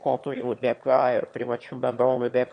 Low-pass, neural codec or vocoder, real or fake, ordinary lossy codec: 9.9 kHz; autoencoder, 22.05 kHz, a latent of 192 numbers a frame, VITS, trained on one speaker; fake; MP3, 48 kbps